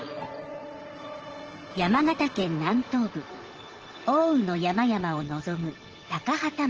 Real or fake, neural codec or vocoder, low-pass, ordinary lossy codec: fake; vocoder, 44.1 kHz, 80 mel bands, Vocos; 7.2 kHz; Opus, 16 kbps